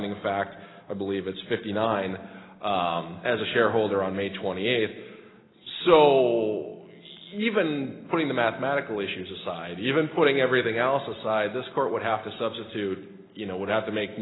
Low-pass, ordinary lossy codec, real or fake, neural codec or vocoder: 7.2 kHz; AAC, 16 kbps; real; none